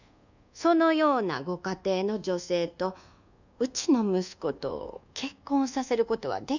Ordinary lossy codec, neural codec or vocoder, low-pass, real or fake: none; codec, 24 kHz, 1.2 kbps, DualCodec; 7.2 kHz; fake